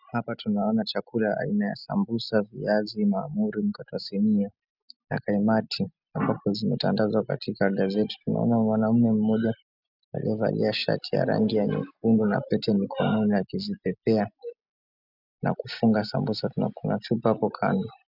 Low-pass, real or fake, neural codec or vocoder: 5.4 kHz; real; none